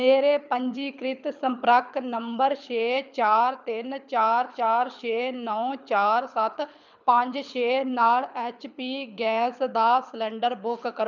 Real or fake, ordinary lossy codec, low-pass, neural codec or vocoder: fake; none; 7.2 kHz; codec, 24 kHz, 6 kbps, HILCodec